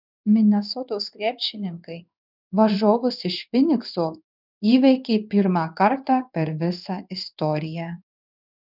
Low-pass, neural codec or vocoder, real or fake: 5.4 kHz; codec, 16 kHz in and 24 kHz out, 1 kbps, XY-Tokenizer; fake